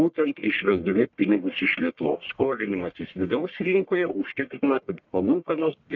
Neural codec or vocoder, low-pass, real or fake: codec, 44.1 kHz, 1.7 kbps, Pupu-Codec; 7.2 kHz; fake